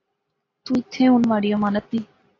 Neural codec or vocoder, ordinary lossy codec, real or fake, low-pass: none; AAC, 32 kbps; real; 7.2 kHz